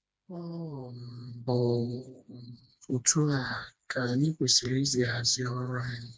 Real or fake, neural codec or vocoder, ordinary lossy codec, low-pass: fake; codec, 16 kHz, 2 kbps, FreqCodec, smaller model; none; none